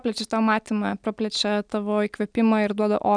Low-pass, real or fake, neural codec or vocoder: 9.9 kHz; real; none